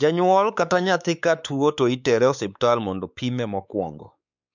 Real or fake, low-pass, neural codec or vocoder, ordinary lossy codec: fake; 7.2 kHz; codec, 16 kHz, 4 kbps, X-Codec, WavLM features, trained on Multilingual LibriSpeech; none